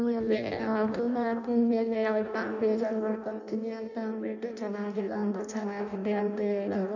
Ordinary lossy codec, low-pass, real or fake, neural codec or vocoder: none; 7.2 kHz; fake; codec, 16 kHz in and 24 kHz out, 0.6 kbps, FireRedTTS-2 codec